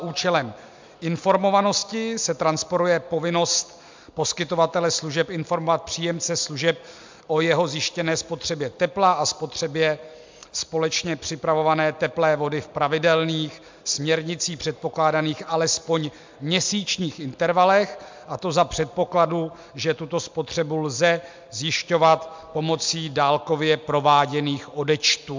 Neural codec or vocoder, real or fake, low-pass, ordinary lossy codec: none; real; 7.2 kHz; MP3, 64 kbps